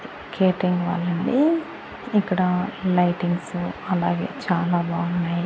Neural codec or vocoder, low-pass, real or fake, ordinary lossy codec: none; none; real; none